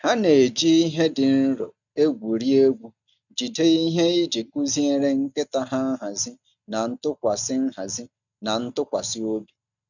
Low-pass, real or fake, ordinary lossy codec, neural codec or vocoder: 7.2 kHz; real; none; none